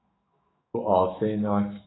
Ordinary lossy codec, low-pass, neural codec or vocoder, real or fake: AAC, 16 kbps; 7.2 kHz; autoencoder, 48 kHz, 128 numbers a frame, DAC-VAE, trained on Japanese speech; fake